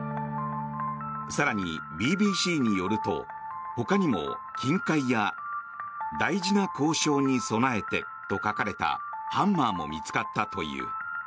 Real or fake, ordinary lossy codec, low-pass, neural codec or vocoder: real; none; none; none